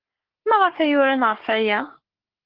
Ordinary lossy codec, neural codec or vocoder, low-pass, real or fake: Opus, 16 kbps; codec, 44.1 kHz, 3.4 kbps, Pupu-Codec; 5.4 kHz; fake